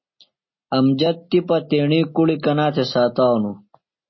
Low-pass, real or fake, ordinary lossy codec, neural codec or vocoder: 7.2 kHz; real; MP3, 24 kbps; none